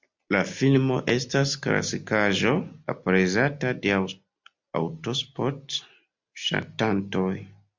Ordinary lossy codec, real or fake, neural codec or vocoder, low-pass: MP3, 64 kbps; real; none; 7.2 kHz